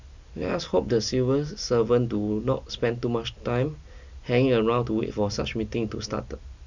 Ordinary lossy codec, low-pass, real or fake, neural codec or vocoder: none; 7.2 kHz; real; none